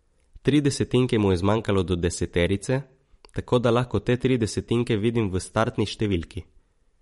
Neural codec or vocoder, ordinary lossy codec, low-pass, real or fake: vocoder, 44.1 kHz, 128 mel bands every 512 samples, BigVGAN v2; MP3, 48 kbps; 19.8 kHz; fake